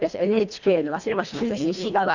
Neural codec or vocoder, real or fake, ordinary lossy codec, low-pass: codec, 24 kHz, 1.5 kbps, HILCodec; fake; none; 7.2 kHz